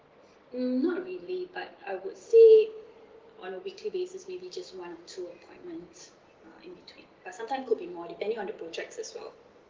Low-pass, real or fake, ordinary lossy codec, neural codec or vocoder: 7.2 kHz; real; Opus, 16 kbps; none